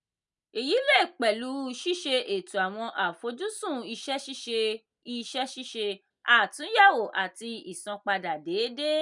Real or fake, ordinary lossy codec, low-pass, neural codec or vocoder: real; none; 10.8 kHz; none